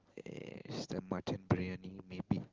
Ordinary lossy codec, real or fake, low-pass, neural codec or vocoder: Opus, 16 kbps; real; 7.2 kHz; none